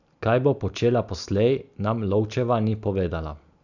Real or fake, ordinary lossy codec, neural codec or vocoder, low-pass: real; none; none; 7.2 kHz